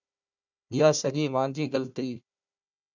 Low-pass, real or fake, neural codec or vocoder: 7.2 kHz; fake; codec, 16 kHz, 1 kbps, FunCodec, trained on Chinese and English, 50 frames a second